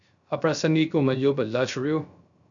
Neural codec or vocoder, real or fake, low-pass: codec, 16 kHz, 0.3 kbps, FocalCodec; fake; 7.2 kHz